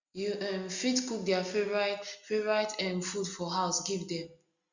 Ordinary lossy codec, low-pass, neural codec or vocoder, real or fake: Opus, 64 kbps; 7.2 kHz; none; real